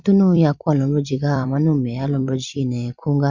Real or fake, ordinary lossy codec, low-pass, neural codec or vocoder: fake; Opus, 64 kbps; 7.2 kHz; vocoder, 44.1 kHz, 128 mel bands every 256 samples, BigVGAN v2